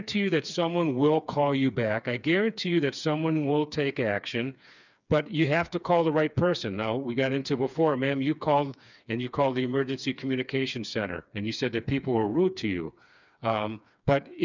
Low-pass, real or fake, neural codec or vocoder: 7.2 kHz; fake; codec, 16 kHz, 4 kbps, FreqCodec, smaller model